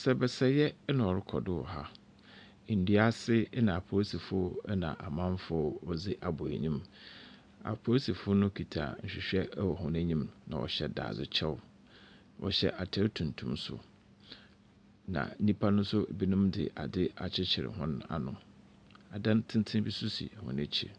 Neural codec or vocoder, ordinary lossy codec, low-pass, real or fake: none; AAC, 64 kbps; 9.9 kHz; real